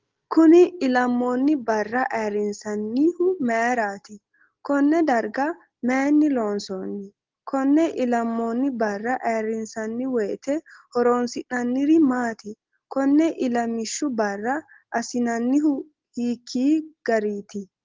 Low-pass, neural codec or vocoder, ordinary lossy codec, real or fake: 7.2 kHz; none; Opus, 16 kbps; real